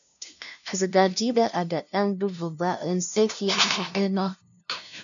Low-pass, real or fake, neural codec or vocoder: 7.2 kHz; fake; codec, 16 kHz, 0.5 kbps, FunCodec, trained on LibriTTS, 25 frames a second